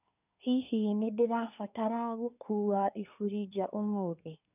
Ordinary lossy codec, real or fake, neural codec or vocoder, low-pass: none; fake; codec, 24 kHz, 1 kbps, SNAC; 3.6 kHz